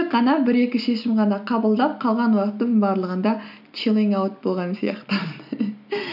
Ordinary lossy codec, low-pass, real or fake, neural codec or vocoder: none; 5.4 kHz; real; none